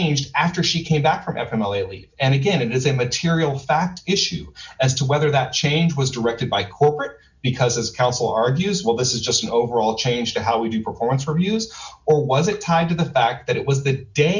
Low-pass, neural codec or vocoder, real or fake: 7.2 kHz; none; real